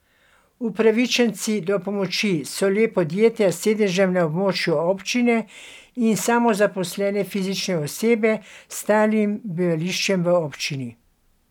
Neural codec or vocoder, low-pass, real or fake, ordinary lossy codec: none; 19.8 kHz; real; none